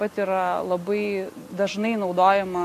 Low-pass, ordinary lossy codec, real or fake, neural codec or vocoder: 14.4 kHz; AAC, 64 kbps; real; none